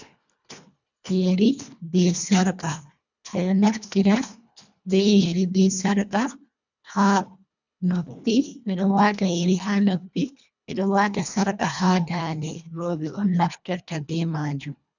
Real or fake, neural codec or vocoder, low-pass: fake; codec, 24 kHz, 1.5 kbps, HILCodec; 7.2 kHz